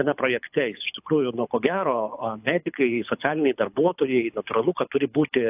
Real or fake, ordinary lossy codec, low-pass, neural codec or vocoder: fake; AAC, 32 kbps; 3.6 kHz; codec, 24 kHz, 6 kbps, HILCodec